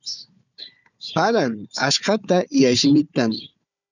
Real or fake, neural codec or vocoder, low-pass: fake; codec, 16 kHz, 4 kbps, FunCodec, trained on Chinese and English, 50 frames a second; 7.2 kHz